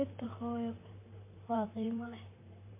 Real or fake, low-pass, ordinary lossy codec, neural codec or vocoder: fake; 3.6 kHz; MP3, 24 kbps; vocoder, 44.1 kHz, 128 mel bands, Pupu-Vocoder